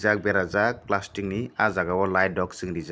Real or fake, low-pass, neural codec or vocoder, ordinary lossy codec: real; none; none; none